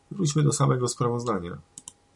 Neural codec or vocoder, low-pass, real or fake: none; 10.8 kHz; real